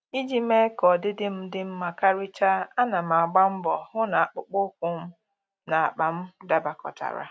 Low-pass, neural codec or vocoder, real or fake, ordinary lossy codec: none; none; real; none